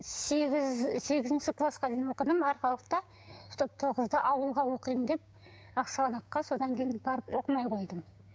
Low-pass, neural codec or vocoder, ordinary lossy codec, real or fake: none; codec, 16 kHz, 6 kbps, DAC; none; fake